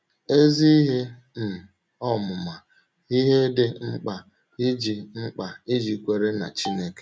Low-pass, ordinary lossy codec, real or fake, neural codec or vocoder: none; none; real; none